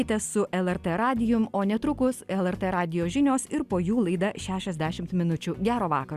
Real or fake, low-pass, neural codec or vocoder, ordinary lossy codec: real; 14.4 kHz; none; Opus, 64 kbps